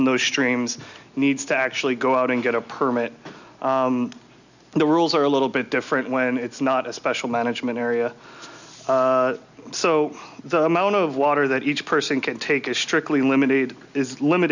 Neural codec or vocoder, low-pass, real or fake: none; 7.2 kHz; real